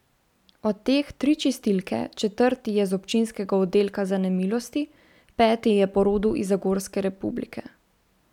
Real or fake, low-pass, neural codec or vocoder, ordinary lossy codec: real; 19.8 kHz; none; none